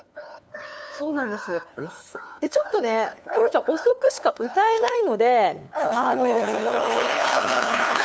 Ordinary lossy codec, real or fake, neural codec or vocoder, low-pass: none; fake; codec, 16 kHz, 2 kbps, FunCodec, trained on LibriTTS, 25 frames a second; none